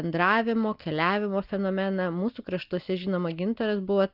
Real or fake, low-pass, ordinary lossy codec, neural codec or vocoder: real; 5.4 kHz; Opus, 32 kbps; none